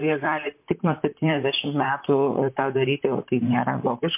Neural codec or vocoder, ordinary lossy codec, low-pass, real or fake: vocoder, 44.1 kHz, 128 mel bands, Pupu-Vocoder; MP3, 32 kbps; 3.6 kHz; fake